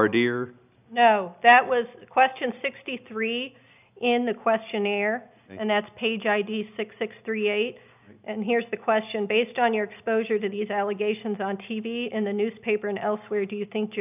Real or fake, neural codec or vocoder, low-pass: real; none; 3.6 kHz